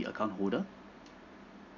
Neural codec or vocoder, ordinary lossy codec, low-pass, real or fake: none; none; 7.2 kHz; real